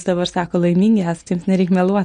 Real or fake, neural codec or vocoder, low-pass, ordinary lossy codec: real; none; 9.9 kHz; MP3, 48 kbps